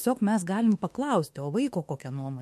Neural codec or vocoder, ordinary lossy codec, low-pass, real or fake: autoencoder, 48 kHz, 32 numbers a frame, DAC-VAE, trained on Japanese speech; MP3, 64 kbps; 14.4 kHz; fake